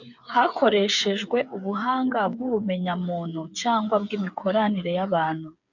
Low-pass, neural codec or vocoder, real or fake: 7.2 kHz; codec, 16 kHz, 8 kbps, FreqCodec, smaller model; fake